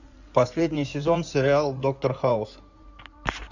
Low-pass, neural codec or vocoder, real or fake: 7.2 kHz; codec, 16 kHz in and 24 kHz out, 2.2 kbps, FireRedTTS-2 codec; fake